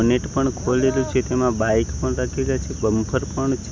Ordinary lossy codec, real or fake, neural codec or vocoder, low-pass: none; real; none; none